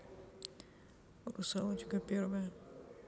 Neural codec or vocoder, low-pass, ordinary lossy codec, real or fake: none; none; none; real